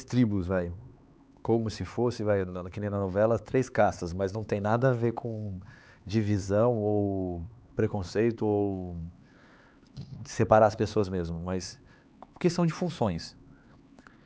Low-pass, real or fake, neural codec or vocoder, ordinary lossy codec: none; fake; codec, 16 kHz, 4 kbps, X-Codec, HuBERT features, trained on LibriSpeech; none